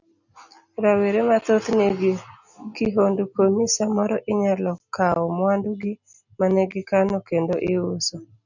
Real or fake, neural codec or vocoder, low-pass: real; none; 7.2 kHz